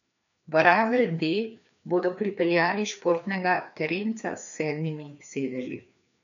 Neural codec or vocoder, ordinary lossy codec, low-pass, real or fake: codec, 16 kHz, 2 kbps, FreqCodec, larger model; none; 7.2 kHz; fake